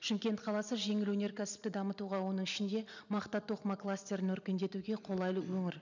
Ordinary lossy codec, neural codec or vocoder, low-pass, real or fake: none; none; 7.2 kHz; real